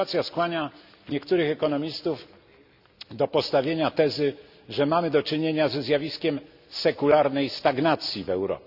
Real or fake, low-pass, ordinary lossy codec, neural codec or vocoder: real; 5.4 kHz; Opus, 64 kbps; none